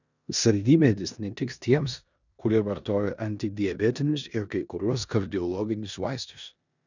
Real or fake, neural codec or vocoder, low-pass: fake; codec, 16 kHz in and 24 kHz out, 0.9 kbps, LongCat-Audio-Codec, four codebook decoder; 7.2 kHz